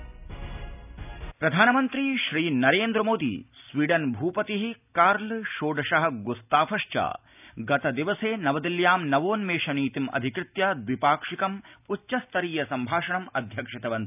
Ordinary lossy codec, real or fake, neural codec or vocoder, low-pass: none; real; none; 3.6 kHz